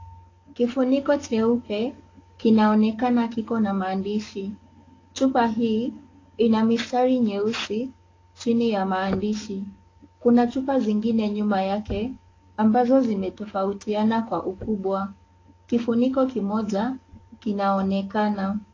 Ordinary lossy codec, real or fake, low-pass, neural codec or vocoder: AAC, 32 kbps; fake; 7.2 kHz; codec, 44.1 kHz, 7.8 kbps, DAC